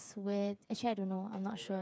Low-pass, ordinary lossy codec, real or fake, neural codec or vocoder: none; none; fake; codec, 16 kHz, 8 kbps, FreqCodec, smaller model